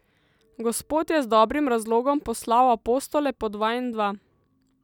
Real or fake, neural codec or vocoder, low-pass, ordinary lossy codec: real; none; 19.8 kHz; none